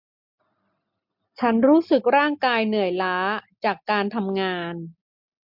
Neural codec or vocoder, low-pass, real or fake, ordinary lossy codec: none; 5.4 kHz; real; MP3, 48 kbps